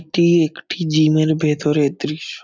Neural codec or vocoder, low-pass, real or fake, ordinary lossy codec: none; none; real; none